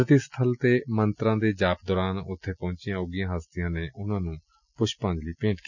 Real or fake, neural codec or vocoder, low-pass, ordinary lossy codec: real; none; 7.2 kHz; none